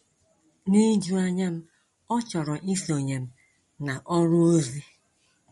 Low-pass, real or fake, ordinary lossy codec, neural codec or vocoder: 19.8 kHz; real; MP3, 48 kbps; none